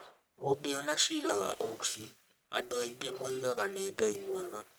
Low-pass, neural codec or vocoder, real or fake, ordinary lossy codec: none; codec, 44.1 kHz, 1.7 kbps, Pupu-Codec; fake; none